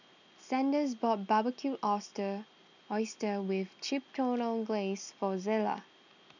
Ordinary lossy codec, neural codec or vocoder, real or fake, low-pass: none; none; real; 7.2 kHz